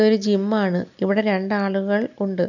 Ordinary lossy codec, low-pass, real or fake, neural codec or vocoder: none; 7.2 kHz; real; none